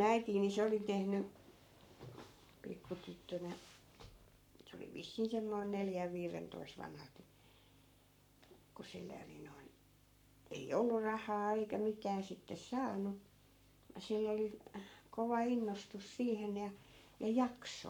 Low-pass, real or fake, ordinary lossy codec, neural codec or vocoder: 19.8 kHz; fake; none; codec, 44.1 kHz, 7.8 kbps, Pupu-Codec